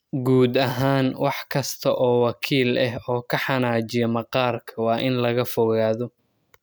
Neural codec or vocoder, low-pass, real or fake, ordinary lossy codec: none; none; real; none